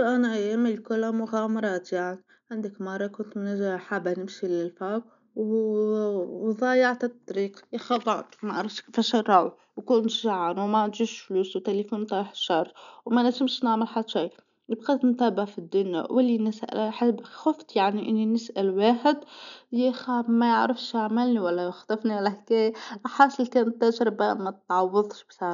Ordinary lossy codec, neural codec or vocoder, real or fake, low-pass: none; none; real; 7.2 kHz